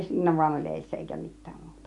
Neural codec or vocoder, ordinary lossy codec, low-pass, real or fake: none; MP3, 96 kbps; 10.8 kHz; real